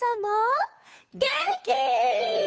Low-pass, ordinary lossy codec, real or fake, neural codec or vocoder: none; none; fake; codec, 16 kHz, 2 kbps, FunCodec, trained on Chinese and English, 25 frames a second